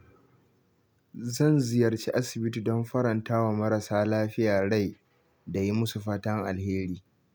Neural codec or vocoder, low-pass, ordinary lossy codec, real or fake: none; none; none; real